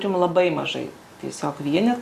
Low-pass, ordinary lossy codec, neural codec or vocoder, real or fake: 14.4 kHz; Opus, 64 kbps; none; real